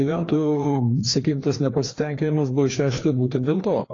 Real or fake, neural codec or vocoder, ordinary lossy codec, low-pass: fake; codec, 16 kHz, 2 kbps, FreqCodec, larger model; AAC, 32 kbps; 7.2 kHz